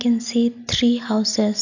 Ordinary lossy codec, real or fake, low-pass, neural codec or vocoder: none; real; 7.2 kHz; none